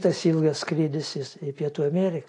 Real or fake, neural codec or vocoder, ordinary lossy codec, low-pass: real; none; AAC, 48 kbps; 10.8 kHz